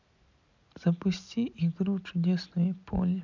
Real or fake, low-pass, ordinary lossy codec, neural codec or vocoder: real; 7.2 kHz; none; none